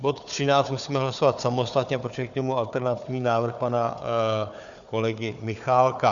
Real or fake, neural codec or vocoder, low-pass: fake; codec, 16 kHz, 4 kbps, FunCodec, trained on Chinese and English, 50 frames a second; 7.2 kHz